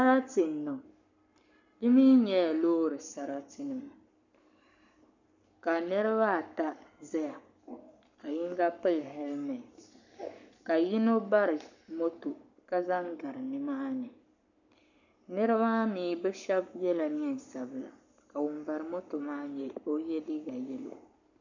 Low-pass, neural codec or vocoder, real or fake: 7.2 kHz; codec, 44.1 kHz, 7.8 kbps, Pupu-Codec; fake